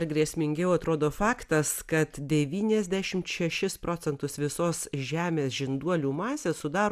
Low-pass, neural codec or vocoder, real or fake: 14.4 kHz; none; real